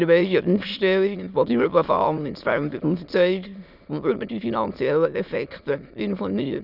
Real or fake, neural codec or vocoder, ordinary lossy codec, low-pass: fake; autoencoder, 22.05 kHz, a latent of 192 numbers a frame, VITS, trained on many speakers; none; 5.4 kHz